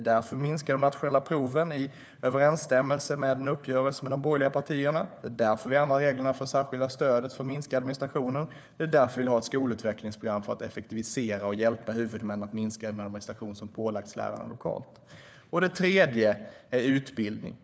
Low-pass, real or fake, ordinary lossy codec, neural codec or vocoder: none; fake; none; codec, 16 kHz, 4 kbps, FunCodec, trained on LibriTTS, 50 frames a second